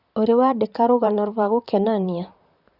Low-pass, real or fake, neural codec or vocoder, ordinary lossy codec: 5.4 kHz; fake; vocoder, 44.1 kHz, 128 mel bands, Pupu-Vocoder; Opus, 64 kbps